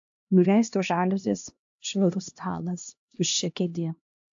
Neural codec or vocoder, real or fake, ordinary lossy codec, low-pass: codec, 16 kHz, 1 kbps, X-Codec, HuBERT features, trained on LibriSpeech; fake; AAC, 64 kbps; 7.2 kHz